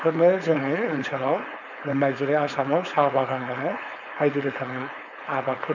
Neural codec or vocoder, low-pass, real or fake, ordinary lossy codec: codec, 16 kHz, 4.8 kbps, FACodec; 7.2 kHz; fake; none